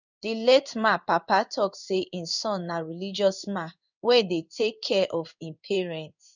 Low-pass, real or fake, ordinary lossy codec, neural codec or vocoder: 7.2 kHz; fake; none; codec, 16 kHz in and 24 kHz out, 1 kbps, XY-Tokenizer